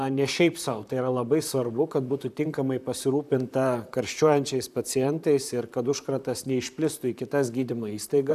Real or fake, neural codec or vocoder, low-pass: fake; vocoder, 44.1 kHz, 128 mel bands, Pupu-Vocoder; 14.4 kHz